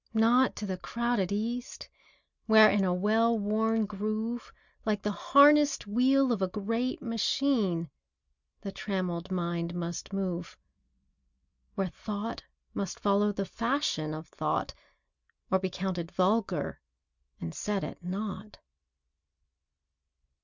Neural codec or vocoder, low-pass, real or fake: none; 7.2 kHz; real